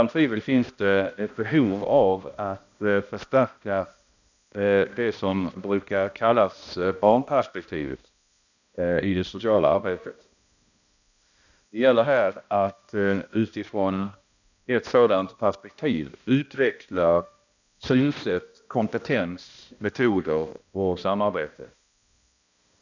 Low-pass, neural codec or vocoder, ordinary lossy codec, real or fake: 7.2 kHz; codec, 16 kHz, 1 kbps, X-Codec, HuBERT features, trained on balanced general audio; none; fake